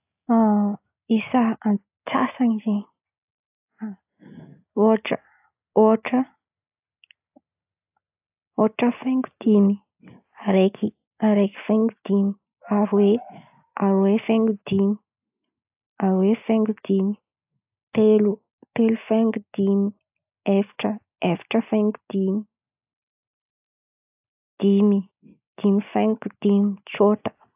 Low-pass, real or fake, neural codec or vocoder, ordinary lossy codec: 3.6 kHz; real; none; AAC, 32 kbps